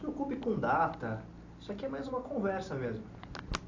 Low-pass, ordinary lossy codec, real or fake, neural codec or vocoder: 7.2 kHz; AAC, 48 kbps; real; none